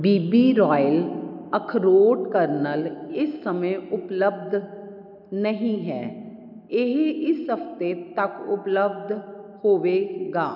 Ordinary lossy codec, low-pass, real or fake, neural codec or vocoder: none; 5.4 kHz; real; none